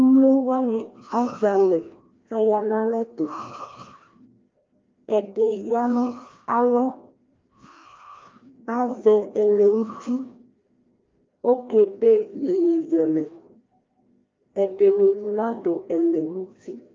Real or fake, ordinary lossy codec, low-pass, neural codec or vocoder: fake; Opus, 24 kbps; 7.2 kHz; codec, 16 kHz, 1 kbps, FreqCodec, larger model